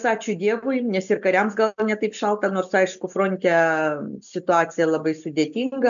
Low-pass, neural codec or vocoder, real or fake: 7.2 kHz; none; real